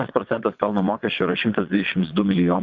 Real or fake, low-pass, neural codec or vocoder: fake; 7.2 kHz; vocoder, 22.05 kHz, 80 mel bands, WaveNeXt